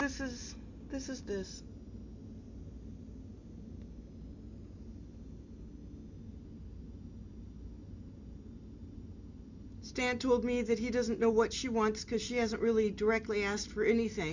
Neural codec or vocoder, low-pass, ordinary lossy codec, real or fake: none; 7.2 kHz; Opus, 64 kbps; real